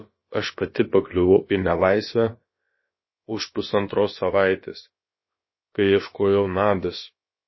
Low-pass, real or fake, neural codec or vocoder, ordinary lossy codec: 7.2 kHz; fake; codec, 16 kHz, about 1 kbps, DyCAST, with the encoder's durations; MP3, 24 kbps